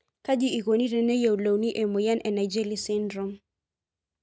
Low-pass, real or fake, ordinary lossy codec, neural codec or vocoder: none; real; none; none